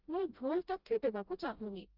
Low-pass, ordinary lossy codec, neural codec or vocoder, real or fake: 5.4 kHz; Opus, 32 kbps; codec, 16 kHz, 0.5 kbps, FreqCodec, smaller model; fake